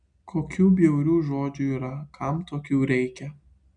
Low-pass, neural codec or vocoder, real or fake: 9.9 kHz; none; real